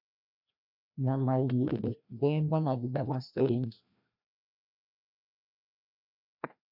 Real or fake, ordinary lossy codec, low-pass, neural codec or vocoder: fake; MP3, 48 kbps; 5.4 kHz; codec, 16 kHz, 1 kbps, FreqCodec, larger model